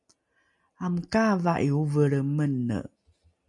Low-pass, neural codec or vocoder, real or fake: 10.8 kHz; none; real